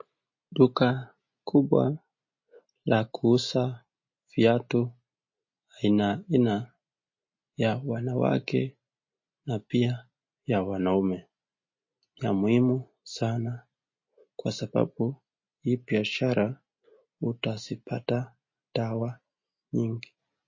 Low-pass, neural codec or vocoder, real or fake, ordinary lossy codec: 7.2 kHz; none; real; MP3, 32 kbps